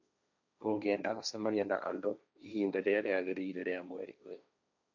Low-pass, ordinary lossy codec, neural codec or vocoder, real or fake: 7.2 kHz; none; codec, 16 kHz, 1.1 kbps, Voila-Tokenizer; fake